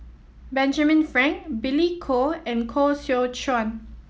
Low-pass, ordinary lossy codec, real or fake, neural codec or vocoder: none; none; real; none